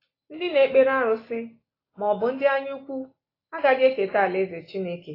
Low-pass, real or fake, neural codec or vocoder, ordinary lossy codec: 5.4 kHz; real; none; AAC, 24 kbps